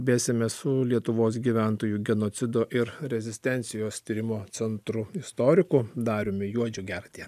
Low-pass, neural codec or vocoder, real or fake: 14.4 kHz; none; real